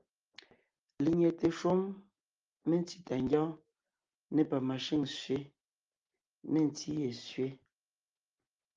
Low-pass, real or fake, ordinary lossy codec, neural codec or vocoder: 7.2 kHz; real; Opus, 32 kbps; none